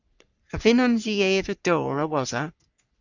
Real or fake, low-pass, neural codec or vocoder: fake; 7.2 kHz; codec, 44.1 kHz, 3.4 kbps, Pupu-Codec